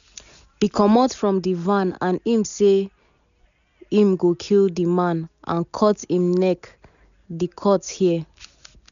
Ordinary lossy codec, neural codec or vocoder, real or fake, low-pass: none; none; real; 7.2 kHz